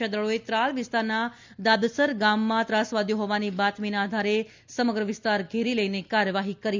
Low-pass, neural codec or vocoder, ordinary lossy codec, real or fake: 7.2 kHz; none; MP3, 64 kbps; real